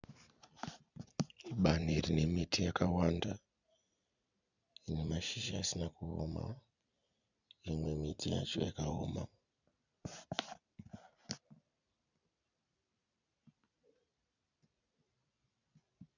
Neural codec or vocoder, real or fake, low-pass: vocoder, 44.1 kHz, 128 mel bands every 256 samples, BigVGAN v2; fake; 7.2 kHz